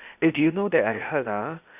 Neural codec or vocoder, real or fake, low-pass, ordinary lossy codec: codec, 16 kHz, 0.8 kbps, ZipCodec; fake; 3.6 kHz; none